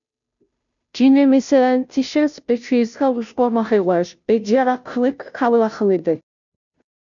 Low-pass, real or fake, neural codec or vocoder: 7.2 kHz; fake; codec, 16 kHz, 0.5 kbps, FunCodec, trained on Chinese and English, 25 frames a second